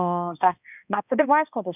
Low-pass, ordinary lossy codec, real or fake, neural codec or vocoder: 3.6 kHz; none; fake; codec, 16 kHz, 1 kbps, X-Codec, HuBERT features, trained on balanced general audio